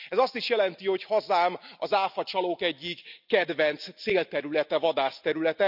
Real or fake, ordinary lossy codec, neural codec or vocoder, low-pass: real; none; none; 5.4 kHz